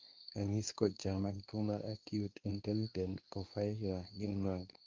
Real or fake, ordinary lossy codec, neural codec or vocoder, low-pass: fake; Opus, 32 kbps; codec, 24 kHz, 0.9 kbps, WavTokenizer, medium speech release version 2; 7.2 kHz